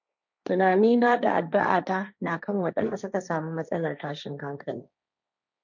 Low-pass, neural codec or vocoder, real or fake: 7.2 kHz; codec, 16 kHz, 1.1 kbps, Voila-Tokenizer; fake